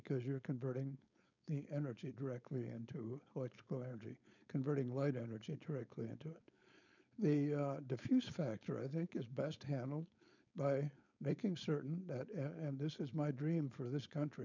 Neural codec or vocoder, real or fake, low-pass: codec, 16 kHz, 4.8 kbps, FACodec; fake; 7.2 kHz